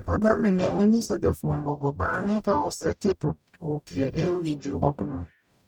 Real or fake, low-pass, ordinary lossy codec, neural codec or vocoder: fake; 19.8 kHz; none; codec, 44.1 kHz, 0.9 kbps, DAC